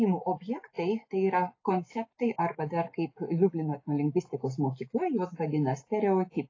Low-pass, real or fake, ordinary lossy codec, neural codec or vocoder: 7.2 kHz; real; AAC, 32 kbps; none